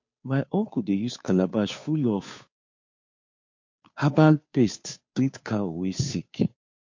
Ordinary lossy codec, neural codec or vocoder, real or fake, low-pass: MP3, 48 kbps; codec, 16 kHz, 2 kbps, FunCodec, trained on Chinese and English, 25 frames a second; fake; 7.2 kHz